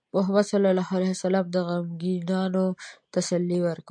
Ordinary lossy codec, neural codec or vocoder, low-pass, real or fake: MP3, 64 kbps; none; 9.9 kHz; real